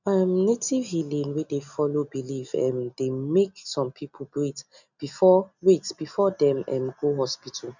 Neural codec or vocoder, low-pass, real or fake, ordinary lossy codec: none; 7.2 kHz; real; none